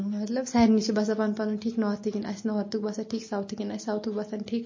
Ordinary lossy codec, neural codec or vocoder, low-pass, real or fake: MP3, 32 kbps; none; 7.2 kHz; real